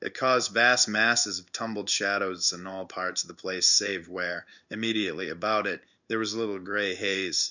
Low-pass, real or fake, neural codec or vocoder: 7.2 kHz; fake; codec, 16 kHz in and 24 kHz out, 1 kbps, XY-Tokenizer